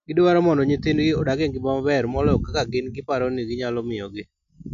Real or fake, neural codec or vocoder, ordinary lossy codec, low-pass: real; none; none; 7.2 kHz